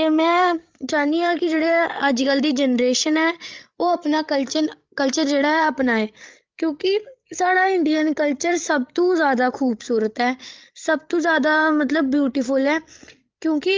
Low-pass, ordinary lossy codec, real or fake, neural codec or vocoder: 7.2 kHz; Opus, 32 kbps; fake; codec, 16 kHz, 8 kbps, FreqCodec, larger model